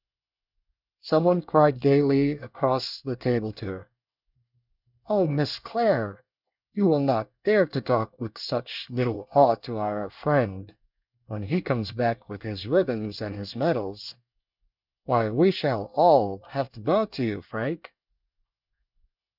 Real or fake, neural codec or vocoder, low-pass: fake; codec, 24 kHz, 1 kbps, SNAC; 5.4 kHz